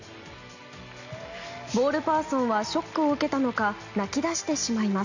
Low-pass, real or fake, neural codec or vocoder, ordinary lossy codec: 7.2 kHz; real; none; none